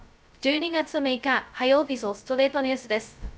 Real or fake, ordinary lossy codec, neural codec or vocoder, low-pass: fake; none; codec, 16 kHz, 0.2 kbps, FocalCodec; none